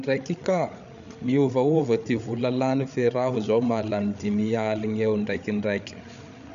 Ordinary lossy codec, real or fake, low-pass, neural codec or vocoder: MP3, 96 kbps; fake; 7.2 kHz; codec, 16 kHz, 8 kbps, FreqCodec, larger model